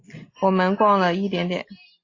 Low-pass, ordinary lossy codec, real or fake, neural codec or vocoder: 7.2 kHz; AAC, 32 kbps; real; none